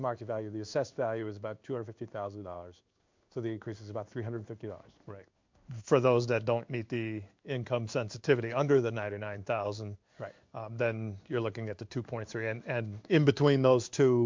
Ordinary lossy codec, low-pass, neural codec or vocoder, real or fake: MP3, 64 kbps; 7.2 kHz; codec, 16 kHz in and 24 kHz out, 1 kbps, XY-Tokenizer; fake